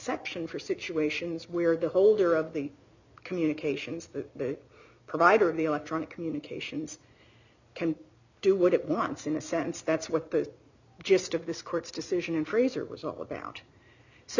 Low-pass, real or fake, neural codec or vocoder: 7.2 kHz; real; none